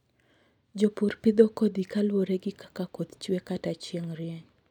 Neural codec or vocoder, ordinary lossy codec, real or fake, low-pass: none; none; real; 19.8 kHz